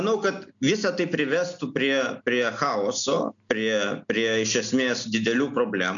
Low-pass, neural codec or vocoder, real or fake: 7.2 kHz; none; real